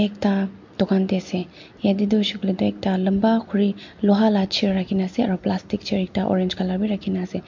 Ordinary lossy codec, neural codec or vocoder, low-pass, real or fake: MP3, 48 kbps; none; 7.2 kHz; real